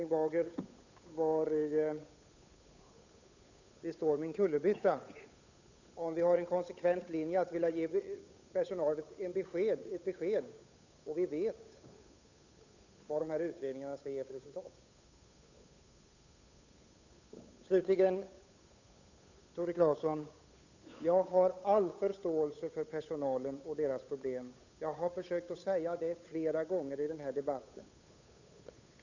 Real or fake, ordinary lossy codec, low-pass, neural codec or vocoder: fake; none; 7.2 kHz; codec, 16 kHz, 8 kbps, FunCodec, trained on Chinese and English, 25 frames a second